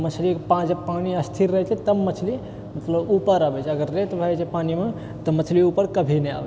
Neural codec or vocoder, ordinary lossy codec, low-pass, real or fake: none; none; none; real